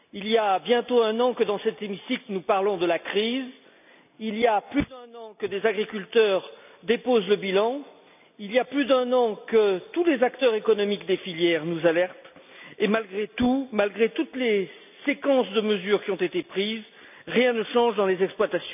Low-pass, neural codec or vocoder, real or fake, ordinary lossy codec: 3.6 kHz; none; real; none